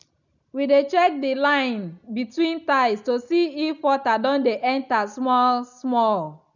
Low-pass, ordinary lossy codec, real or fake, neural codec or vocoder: 7.2 kHz; none; real; none